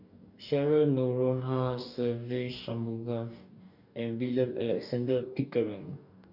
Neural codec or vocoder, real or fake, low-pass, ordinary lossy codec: codec, 44.1 kHz, 2.6 kbps, DAC; fake; 5.4 kHz; none